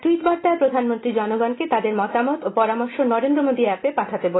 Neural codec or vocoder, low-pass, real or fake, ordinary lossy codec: none; 7.2 kHz; real; AAC, 16 kbps